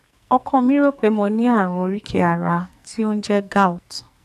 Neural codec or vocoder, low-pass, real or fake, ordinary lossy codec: codec, 44.1 kHz, 2.6 kbps, SNAC; 14.4 kHz; fake; none